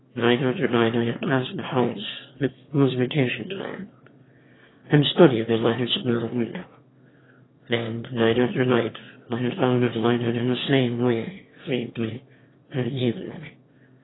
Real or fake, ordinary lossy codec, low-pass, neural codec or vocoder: fake; AAC, 16 kbps; 7.2 kHz; autoencoder, 22.05 kHz, a latent of 192 numbers a frame, VITS, trained on one speaker